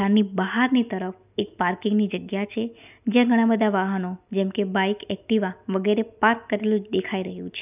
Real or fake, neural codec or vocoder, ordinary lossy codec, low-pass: real; none; none; 3.6 kHz